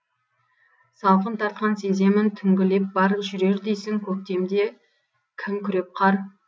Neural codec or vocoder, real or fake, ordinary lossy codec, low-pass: none; real; none; none